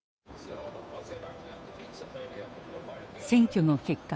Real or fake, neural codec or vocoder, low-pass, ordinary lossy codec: fake; codec, 16 kHz, 2 kbps, FunCodec, trained on Chinese and English, 25 frames a second; none; none